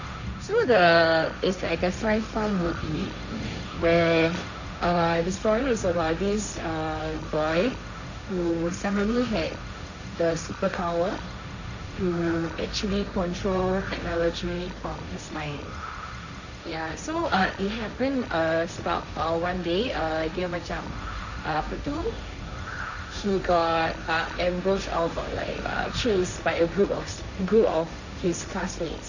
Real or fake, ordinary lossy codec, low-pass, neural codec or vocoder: fake; none; 7.2 kHz; codec, 16 kHz, 1.1 kbps, Voila-Tokenizer